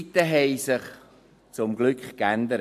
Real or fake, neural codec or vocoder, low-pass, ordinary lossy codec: real; none; 14.4 kHz; MP3, 64 kbps